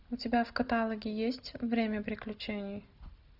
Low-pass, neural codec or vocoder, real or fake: 5.4 kHz; none; real